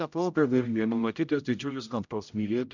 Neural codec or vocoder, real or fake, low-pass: codec, 16 kHz, 0.5 kbps, X-Codec, HuBERT features, trained on general audio; fake; 7.2 kHz